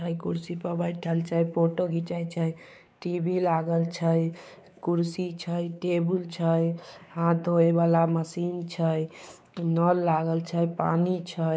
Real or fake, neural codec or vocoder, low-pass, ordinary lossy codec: fake; codec, 16 kHz, 4 kbps, X-Codec, WavLM features, trained on Multilingual LibriSpeech; none; none